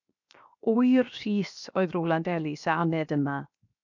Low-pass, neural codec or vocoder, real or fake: 7.2 kHz; codec, 16 kHz, 0.7 kbps, FocalCodec; fake